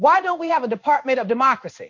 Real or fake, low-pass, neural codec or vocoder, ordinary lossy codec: fake; 7.2 kHz; codec, 16 kHz in and 24 kHz out, 1 kbps, XY-Tokenizer; MP3, 48 kbps